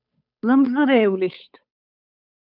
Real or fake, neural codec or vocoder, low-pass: fake; codec, 16 kHz, 8 kbps, FunCodec, trained on Chinese and English, 25 frames a second; 5.4 kHz